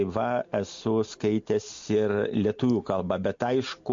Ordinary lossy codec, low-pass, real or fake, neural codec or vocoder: MP3, 48 kbps; 7.2 kHz; real; none